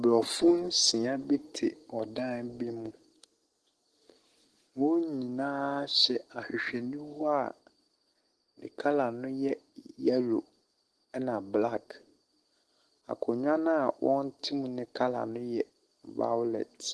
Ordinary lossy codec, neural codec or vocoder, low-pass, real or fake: Opus, 16 kbps; none; 10.8 kHz; real